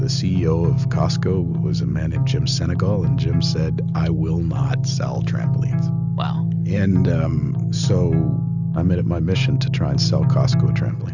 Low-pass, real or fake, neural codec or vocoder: 7.2 kHz; real; none